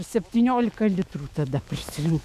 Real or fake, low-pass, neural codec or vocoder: fake; 14.4 kHz; autoencoder, 48 kHz, 128 numbers a frame, DAC-VAE, trained on Japanese speech